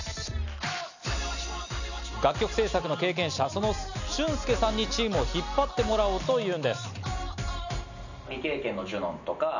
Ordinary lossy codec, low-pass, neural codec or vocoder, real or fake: none; 7.2 kHz; none; real